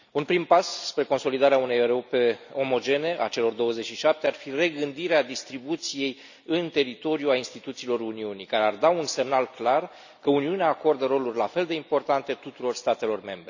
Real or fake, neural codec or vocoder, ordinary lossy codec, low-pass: real; none; none; 7.2 kHz